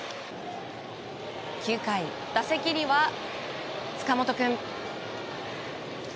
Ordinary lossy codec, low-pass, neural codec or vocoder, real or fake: none; none; none; real